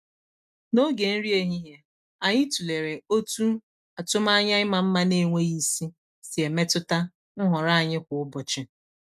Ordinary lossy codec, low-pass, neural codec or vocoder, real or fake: none; 14.4 kHz; none; real